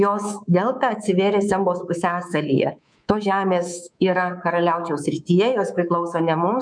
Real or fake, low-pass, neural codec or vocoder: fake; 9.9 kHz; codec, 24 kHz, 3.1 kbps, DualCodec